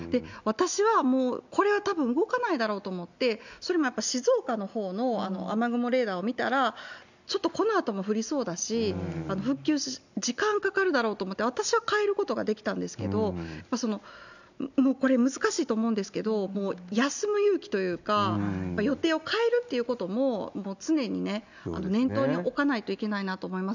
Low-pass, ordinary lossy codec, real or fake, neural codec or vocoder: 7.2 kHz; none; real; none